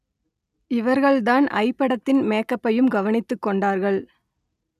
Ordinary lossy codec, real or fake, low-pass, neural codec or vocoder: none; real; 14.4 kHz; none